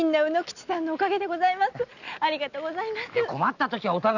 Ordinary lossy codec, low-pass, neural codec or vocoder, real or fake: none; 7.2 kHz; none; real